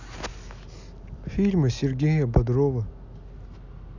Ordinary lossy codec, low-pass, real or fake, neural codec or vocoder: none; 7.2 kHz; real; none